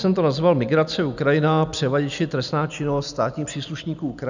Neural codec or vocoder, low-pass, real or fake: none; 7.2 kHz; real